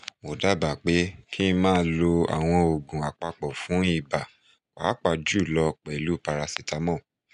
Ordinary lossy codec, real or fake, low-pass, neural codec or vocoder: none; real; 10.8 kHz; none